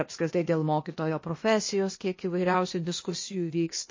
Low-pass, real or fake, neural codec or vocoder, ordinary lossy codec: 7.2 kHz; fake; codec, 16 kHz, 0.8 kbps, ZipCodec; MP3, 32 kbps